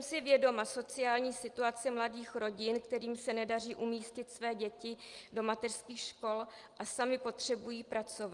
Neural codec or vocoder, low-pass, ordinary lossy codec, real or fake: none; 10.8 kHz; Opus, 32 kbps; real